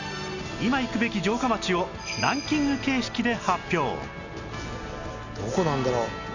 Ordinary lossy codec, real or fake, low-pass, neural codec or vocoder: none; real; 7.2 kHz; none